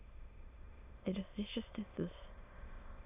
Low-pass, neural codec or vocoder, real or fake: 3.6 kHz; autoencoder, 22.05 kHz, a latent of 192 numbers a frame, VITS, trained on many speakers; fake